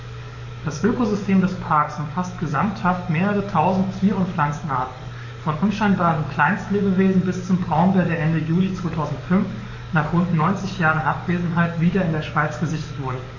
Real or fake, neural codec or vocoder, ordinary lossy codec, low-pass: fake; codec, 44.1 kHz, 7.8 kbps, DAC; none; 7.2 kHz